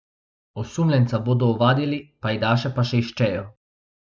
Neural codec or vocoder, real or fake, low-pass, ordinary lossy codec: none; real; none; none